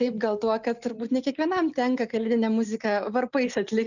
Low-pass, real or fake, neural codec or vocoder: 7.2 kHz; real; none